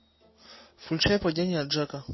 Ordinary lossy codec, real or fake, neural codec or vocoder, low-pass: MP3, 24 kbps; real; none; 7.2 kHz